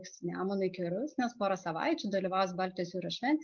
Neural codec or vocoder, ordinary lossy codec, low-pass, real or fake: none; Opus, 24 kbps; 7.2 kHz; real